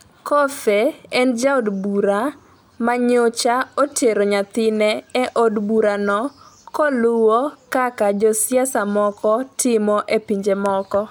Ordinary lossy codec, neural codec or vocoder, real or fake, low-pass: none; none; real; none